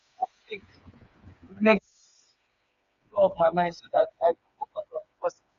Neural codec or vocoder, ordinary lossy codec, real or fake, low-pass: codec, 16 kHz, 4 kbps, FreqCodec, smaller model; MP3, 64 kbps; fake; 7.2 kHz